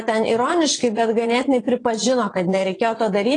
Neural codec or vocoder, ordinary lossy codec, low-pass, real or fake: vocoder, 22.05 kHz, 80 mel bands, Vocos; AAC, 32 kbps; 9.9 kHz; fake